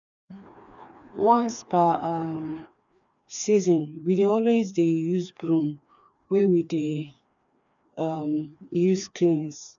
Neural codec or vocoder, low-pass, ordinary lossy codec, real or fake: codec, 16 kHz, 2 kbps, FreqCodec, larger model; 7.2 kHz; none; fake